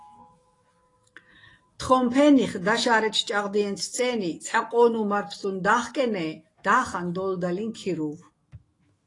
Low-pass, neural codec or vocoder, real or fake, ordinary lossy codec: 10.8 kHz; autoencoder, 48 kHz, 128 numbers a frame, DAC-VAE, trained on Japanese speech; fake; AAC, 32 kbps